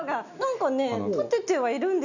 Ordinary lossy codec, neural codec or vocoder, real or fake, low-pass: none; none; real; 7.2 kHz